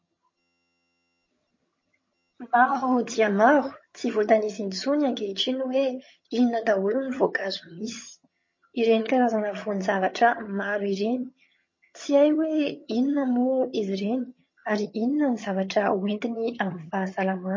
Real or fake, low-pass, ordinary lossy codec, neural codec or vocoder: fake; 7.2 kHz; MP3, 32 kbps; vocoder, 22.05 kHz, 80 mel bands, HiFi-GAN